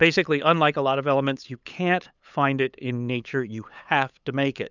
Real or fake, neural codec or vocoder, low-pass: fake; codec, 16 kHz, 8 kbps, FunCodec, trained on LibriTTS, 25 frames a second; 7.2 kHz